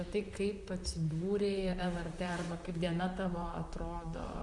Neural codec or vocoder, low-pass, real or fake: vocoder, 44.1 kHz, 128 mel bands, Pupu-Vocoder; 10.8 kHz; fake